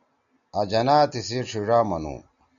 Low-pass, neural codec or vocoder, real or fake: 7.2 kHz; none; real